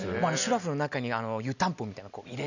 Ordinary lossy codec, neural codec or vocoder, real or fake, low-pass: none; none; real; 7.2 kHz